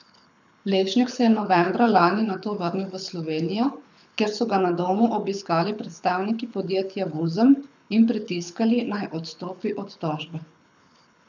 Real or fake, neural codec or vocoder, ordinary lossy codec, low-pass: fake; codec, 24 kHz, 6 kbps, HILCodec; none; 7.2 kHz